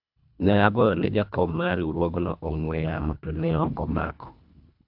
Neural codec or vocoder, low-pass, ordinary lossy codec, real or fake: codec, 24 kHz, 1.5 kbps, HILCodec; 5.4 kHz; AAC, 48 kbps; fake